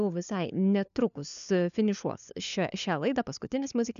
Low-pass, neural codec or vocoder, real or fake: 7.2 kHz; codec, 16 kHz, 4 kbps, FunCodec, trained on LibriTTS, 50 frames a second; fake